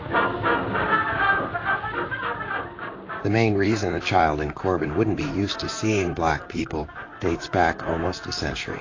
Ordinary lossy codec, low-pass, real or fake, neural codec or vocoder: AAC, 32 kbps; 7.2 kHz; fake; vocoder, 44.1 kHz, 128 mel bands, Pupu-Vocoder